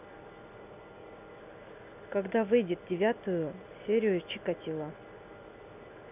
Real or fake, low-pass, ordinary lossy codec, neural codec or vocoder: real; 3.6 kHz; none; none